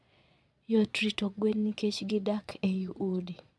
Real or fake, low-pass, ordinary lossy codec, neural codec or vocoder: fake; none; none; vocoder, 22.05 kHz, 80 mel bands, Vocos